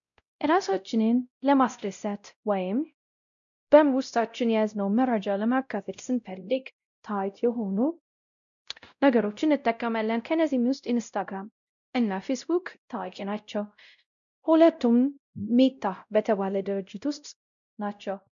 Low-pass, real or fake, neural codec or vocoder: 7.2 kHz; fake; codec, 16 kHz, 0.5 kbps, X-Codec, WavLM features, trained on Multilingual LibriSpeech